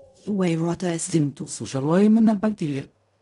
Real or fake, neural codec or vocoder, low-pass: fake; codec, 16 kHz in and 24 kHz out, 0.4 kbps, LongCat-Audio-Codec, fine tuned four codebook decoder; 10.8 kHz